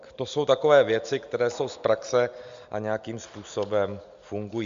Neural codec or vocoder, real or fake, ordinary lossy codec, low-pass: none; real; MP3, 64 kbps; 7.2 kHz